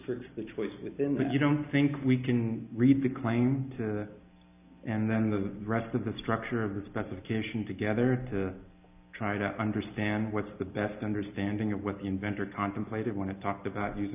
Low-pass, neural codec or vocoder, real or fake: 3.6 kHz; none; real